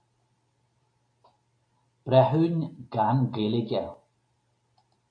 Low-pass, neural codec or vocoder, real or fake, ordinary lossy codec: 9.9 kHz; none; real; MP3, 48 kbps